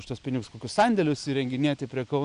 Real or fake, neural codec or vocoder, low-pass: real; none; 9.9 kHz